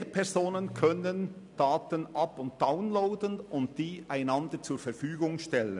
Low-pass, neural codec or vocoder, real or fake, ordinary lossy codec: 10.8 kHz; none; real; none